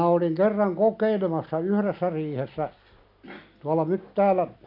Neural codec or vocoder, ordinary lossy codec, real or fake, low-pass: none; none; real; 5.4 kHz